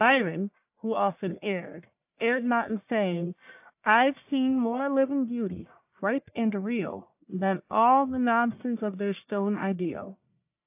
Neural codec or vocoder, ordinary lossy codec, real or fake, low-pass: codec, 44.1 kHz, 1.7 kbps, Pupu-Codec; AAC, 32 kbps; fake; 3.6 kHz